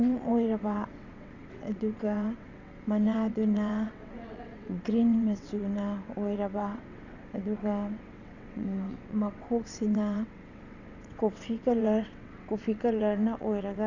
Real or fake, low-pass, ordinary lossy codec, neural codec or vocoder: fake; 7.2 kHz; none; vocoder, 22.05 kHz, 80 mel bands, WaveNeXt